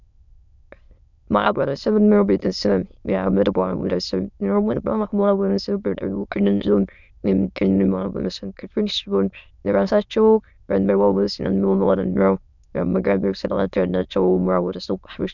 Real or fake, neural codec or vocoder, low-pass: fake; autoencoder, 22.05 kHz, a latent of 192 numbers a frame, VITS, trained on many speakers; 7.2 kHz